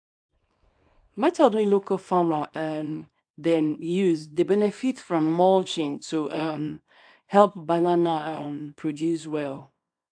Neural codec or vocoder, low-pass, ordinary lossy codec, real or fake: codec, 24 kHz, 0.9 kbps, WavTokenizer, small release; 9.9 kHz; AAC, 64 kbps; fake